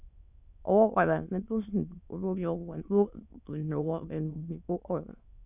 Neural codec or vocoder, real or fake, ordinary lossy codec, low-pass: autoencoder, 22.05 kHz, a latent of 192 numbers a frame, VITS, trained on many speakers; fake; none; 3.6 kHz